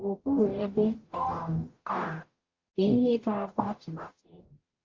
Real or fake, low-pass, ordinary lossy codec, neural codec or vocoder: fake; 7.2 kHz; Opus, 24 kbps; codec, 44.1 kHz, 0.9 kbps, DAC